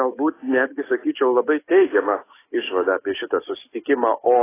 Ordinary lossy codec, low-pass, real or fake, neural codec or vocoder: AAC, 16 kbps; 3.6 kHz; fake; codec, 44.1 kHz, 7.8 kbps, DAC